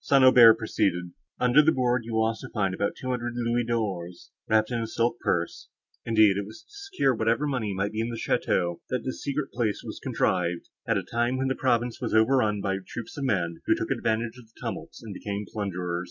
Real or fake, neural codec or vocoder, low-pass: real; none; 7.2 kHz